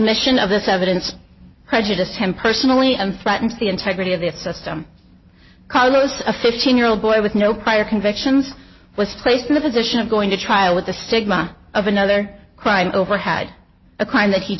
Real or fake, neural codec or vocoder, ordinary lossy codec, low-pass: real; none; MP3, 24 kbps; 7.2 kHz